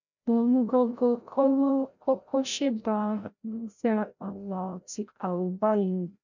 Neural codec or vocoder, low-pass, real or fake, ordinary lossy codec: codec, 16 kHz, 0.5 kbps, FreqCodec, larger model; 7.2 kHz; fake; none